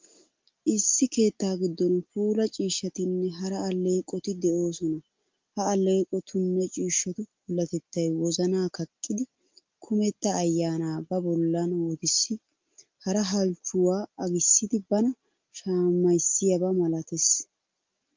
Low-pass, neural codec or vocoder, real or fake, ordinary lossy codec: 7.2 kHz; none; real; Opus, 32 kbps